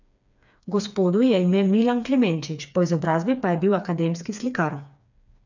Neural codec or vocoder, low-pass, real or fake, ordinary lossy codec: codec, 16 kHz, 4 kbps, FreqCodec, smaller model; 7.2 kHz; fake; none